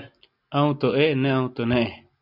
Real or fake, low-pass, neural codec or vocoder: real; 5.4 kHz; none